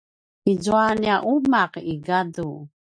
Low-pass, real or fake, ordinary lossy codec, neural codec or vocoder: 9.9 kHz; real; AAC, 64 kbps; none